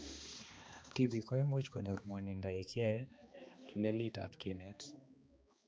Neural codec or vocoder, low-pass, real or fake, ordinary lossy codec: codec, 16 kHz, 2 kbps, X-Codec, HuBERT features, trained on balanced general audio; none; fake; none